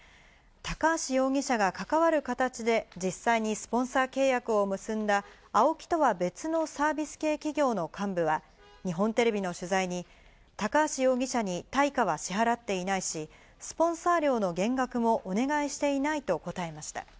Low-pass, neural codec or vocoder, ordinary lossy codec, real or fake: none; none; none; real